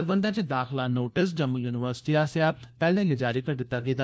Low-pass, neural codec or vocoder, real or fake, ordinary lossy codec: none; codec, 16 kHz, 1 kbps, FunCodec, trained on LibriTTS, 50 frames a second; fake; none